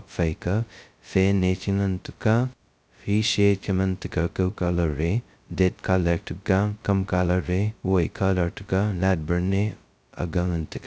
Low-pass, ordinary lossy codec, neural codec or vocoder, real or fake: none; none; codec, 16 kHz, 0.2 kbps, FocalCodec; fake